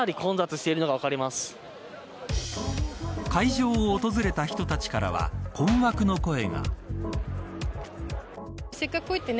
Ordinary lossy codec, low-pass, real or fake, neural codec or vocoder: none; none; real; none